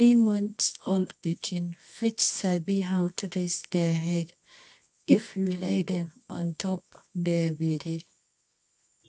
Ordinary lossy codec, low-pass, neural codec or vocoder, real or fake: AAC, 64 kbps; 10.8 kHz; codec, 24 kHz, 0.9 kbps, WavTokenizer, medium music audio release; fake